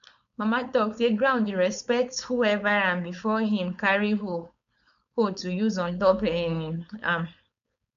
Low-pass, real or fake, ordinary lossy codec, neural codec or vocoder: 7.2 kHz; fake; none; codec, 16 kHz, 4.8 kbps, FACodec